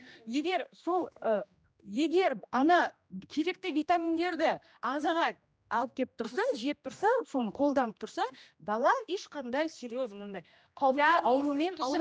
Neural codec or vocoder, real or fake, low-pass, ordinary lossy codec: codec, 16 kHz, 1 kbps, X-Codec, HuBERT features, trained on general audio; fake; none; none